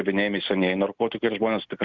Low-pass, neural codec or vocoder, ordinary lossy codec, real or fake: 7.2 kHz; none; Opus, 64 kbps; real